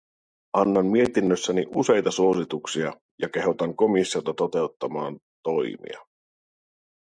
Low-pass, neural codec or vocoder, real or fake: 9.9 kHz; none; real